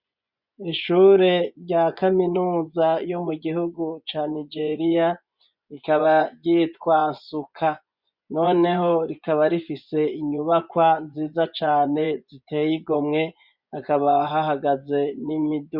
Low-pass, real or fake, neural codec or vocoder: 5.4 kHz; fake; vocoder, 24 kHz, 100 mel bands, Vocos